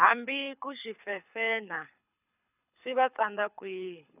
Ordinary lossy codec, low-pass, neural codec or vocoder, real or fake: none; 3.6 kHz; codec, 24 kHz, 6 kbps, HILCodec; fake